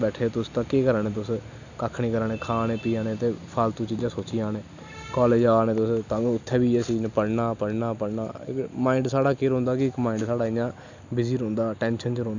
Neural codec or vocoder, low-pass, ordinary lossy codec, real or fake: none; 7.2 kHz; none; real